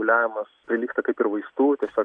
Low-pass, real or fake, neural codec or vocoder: 9.9 kHz; real; none